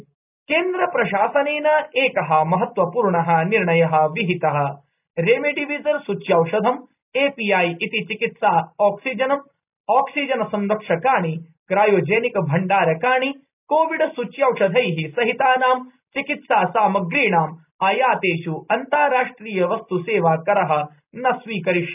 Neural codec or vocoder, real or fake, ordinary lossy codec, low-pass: none; real; none; 3.6 kHz